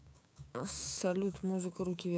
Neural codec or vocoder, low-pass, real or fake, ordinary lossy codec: codec, 16 kHz, 6 kbps, DAC; none; fake; none